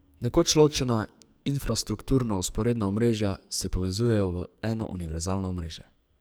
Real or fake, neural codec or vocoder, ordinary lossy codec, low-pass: fake; codec, 44.1 kHz, 2.6 kbps, SNAC; none; none